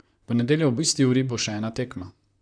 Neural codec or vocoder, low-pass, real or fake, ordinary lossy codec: vocoder, 44.1 kHz, 128 mel bands, Pupu-Vocoder; 9.9 kHz; fake; none